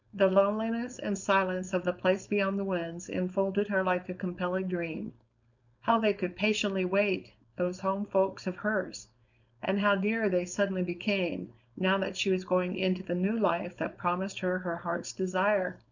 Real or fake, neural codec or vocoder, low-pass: fake; codec, 16 kHz, 4.8 kbps, FACodec; 7.2 kHz